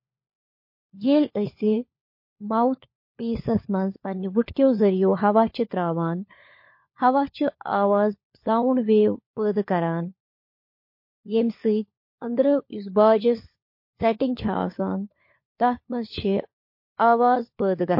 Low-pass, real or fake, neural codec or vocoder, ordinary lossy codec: 5.4 kHz; fake; codec, 16 kHz, 4 kbps, FunCodec, trained on LibriTTS, 50 frames a second; MP3, 32 kbps